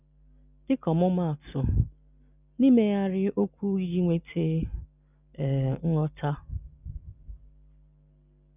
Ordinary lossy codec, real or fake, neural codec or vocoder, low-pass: none; real; none; 3.6 kHz